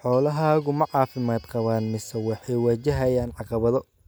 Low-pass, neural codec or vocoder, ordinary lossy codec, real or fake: none; none; none; real